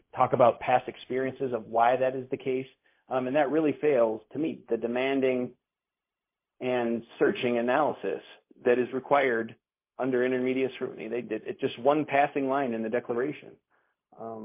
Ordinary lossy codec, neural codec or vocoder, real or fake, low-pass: MP3, 24 kbps; codec, 16 kHz, 0.4 kbps, LongCat-Audio-Codec; fake; 3.6 kHz